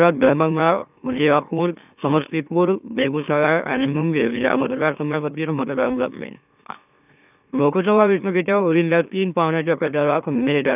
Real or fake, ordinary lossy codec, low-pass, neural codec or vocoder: fake; none; 3.6 kHz; autoencoder, 44.1 kHz, a latent of 192 numbers a frame, MeloTTS